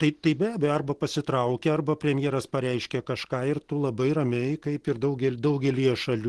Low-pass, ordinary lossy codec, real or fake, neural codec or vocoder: 10.8 kHz; Opus, 16 kbps; real; none